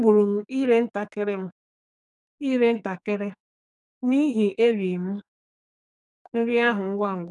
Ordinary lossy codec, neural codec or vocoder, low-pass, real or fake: none; codec, 44.1 kHz, 2.6 kbps, SNAC; 10.8 kHz; fake